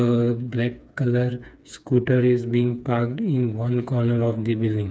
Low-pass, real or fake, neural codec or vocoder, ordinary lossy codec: none; fake; codec, 16 kHz, 4 kbps, FreqCodec, smaller model; none